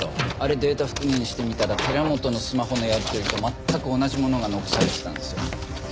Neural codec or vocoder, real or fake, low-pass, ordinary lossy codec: none; real; none; none